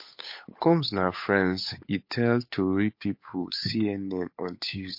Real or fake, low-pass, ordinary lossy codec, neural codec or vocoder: fake; 5.4 kHz; MP3, 32 kbps; codec, 16 kHz, 8 kbps, FunCodec, trained on Chinese and English, 25 frames a second